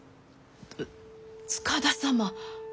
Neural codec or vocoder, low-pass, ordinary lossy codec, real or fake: none; none; none; real